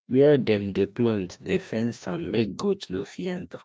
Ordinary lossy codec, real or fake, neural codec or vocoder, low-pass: none; fake; codec, 16 kHz, 1 kbps, FreqCodec, larger model; none